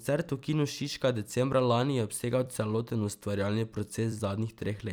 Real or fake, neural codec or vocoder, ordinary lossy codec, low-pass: fake; vocoder, 44.1 kHz, 128 mel bands every 256 samples, BigVGAN v2; none; none